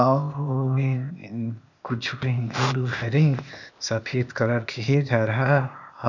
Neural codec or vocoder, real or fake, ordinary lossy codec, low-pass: codec, 16 kHz, 0.8 kbps, ZipCodec; fake; none; 7.2 kHz